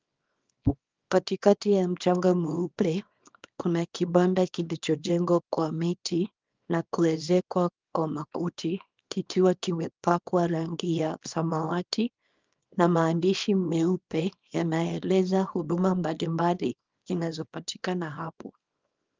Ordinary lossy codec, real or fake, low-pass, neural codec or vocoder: Opus, 32 kbps; fake; 7.2 kHz; codec, 24 kHz, 0.9 kbps, WavTokenizer, small release